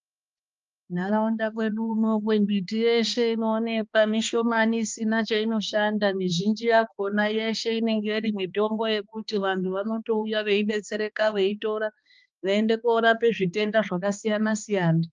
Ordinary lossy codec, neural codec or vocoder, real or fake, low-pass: Opus, 24 kbps; codec, 16 kHz, 2 kbps, X-Codec, HuBERT features, trained on balanced general audio; fake; 7.2 kHz